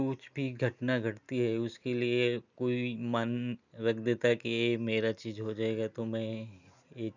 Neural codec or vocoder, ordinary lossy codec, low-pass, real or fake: none; none; 7.2 kHz; real